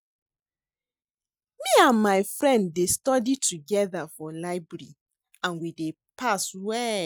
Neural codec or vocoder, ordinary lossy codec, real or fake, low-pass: none; none; real; none